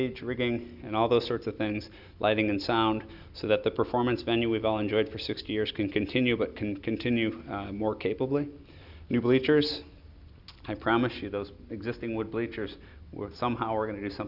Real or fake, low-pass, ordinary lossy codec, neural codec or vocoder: real; 5.4 kHz; Opus, 64 kbps; none